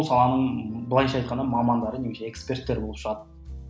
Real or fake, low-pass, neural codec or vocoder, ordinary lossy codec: real; none; none; none